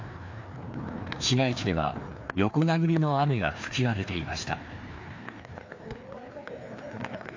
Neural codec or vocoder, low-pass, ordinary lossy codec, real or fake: codec, 16 kHz, 2 kbps, FreqCodec, larger model; 7.2 kHz; AAC, 48 kbps; fake